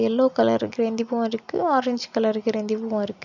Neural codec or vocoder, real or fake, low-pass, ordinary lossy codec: none; real; 7.2 kHz; none